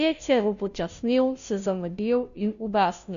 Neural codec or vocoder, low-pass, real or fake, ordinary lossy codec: codec, 16 kHz, 0.5 kbps, FunCodec, trained on Chinese and English, 25 frames a second; 7.2 kHz; fake; MP3, 96 kbps